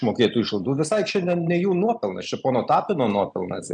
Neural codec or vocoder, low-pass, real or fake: none; 9.9 kHz; real